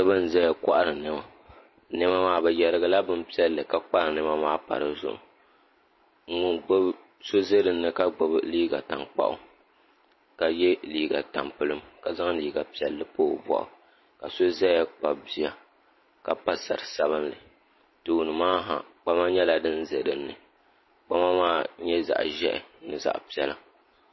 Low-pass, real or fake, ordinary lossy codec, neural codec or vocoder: 7.2 kHz; real; MP3, 24 kbps; none